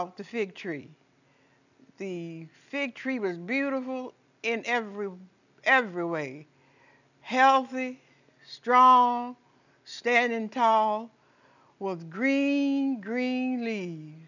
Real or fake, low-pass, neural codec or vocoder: real; 7.2 kHz; none